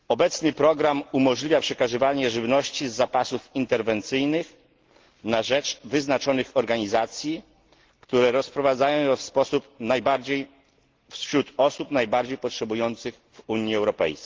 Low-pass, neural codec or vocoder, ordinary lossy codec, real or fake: 7.2 kHz; none; Opus, 16 kbps; real